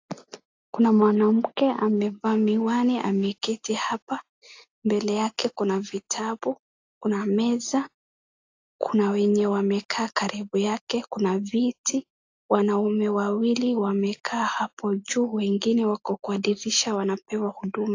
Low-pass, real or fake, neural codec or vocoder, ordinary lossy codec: 7.2 kHz; real; none; AAC, 48 kbps